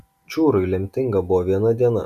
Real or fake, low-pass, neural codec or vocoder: real; 14.4 kHz; none